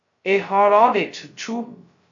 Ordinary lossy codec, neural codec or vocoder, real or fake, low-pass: AAC, 64 kbps; codec, 16 kHz, 0.2 kbps, FocalCodec; fake; 7.2 kHz